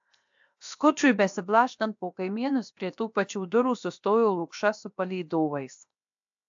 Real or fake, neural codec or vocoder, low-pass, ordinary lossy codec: fake; codec, 16 kHz, 0.7 kbps, FocalCodec; 7.2 kHz; AAC, 64 kbps